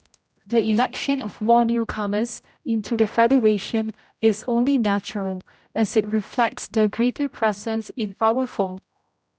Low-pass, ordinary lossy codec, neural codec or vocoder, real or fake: none; none; codec, 16 kHz, 0.5 kbps, X-Codec, HuBERT features, trained on general audio; fake